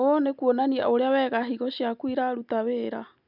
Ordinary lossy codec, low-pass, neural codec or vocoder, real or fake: none; 5.4 kHz; none; real